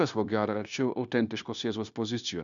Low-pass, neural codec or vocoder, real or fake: 7.2 kHz; codec, 16 kHz, 0.9 kbps, LongCat-Audio-Codec; fake